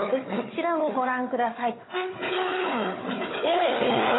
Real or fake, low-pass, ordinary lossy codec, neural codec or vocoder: fake; 7.2 kHz; AAC, 16 kbps; codec, 16 kHz, 4 kbps, FunCodec, trained on Chinese and English, 50 frames a second